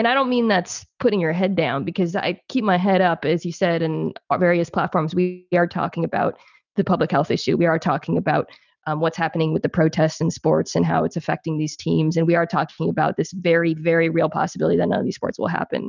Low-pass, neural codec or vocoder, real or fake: 7.2 kHz; none; real